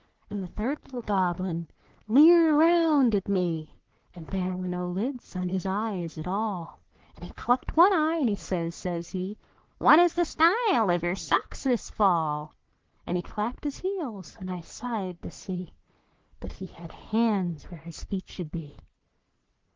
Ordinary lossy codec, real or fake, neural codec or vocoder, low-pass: Opus, 16 kbps; fake; codec, 44.1 kHz, 3.4 kbps, Pupu-Codec; 7.2 kHz